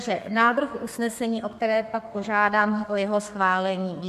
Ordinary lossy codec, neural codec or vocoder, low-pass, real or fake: MP3, 64 kbps; codec, 32 kHz, 1.9 kbps, SNAC; 14.4 kHz; fake